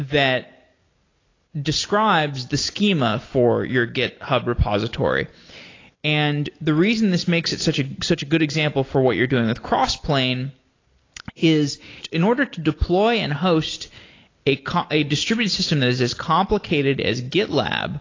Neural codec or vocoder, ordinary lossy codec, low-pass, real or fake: none; AAC, 32 kbps; 7.2 kHz; real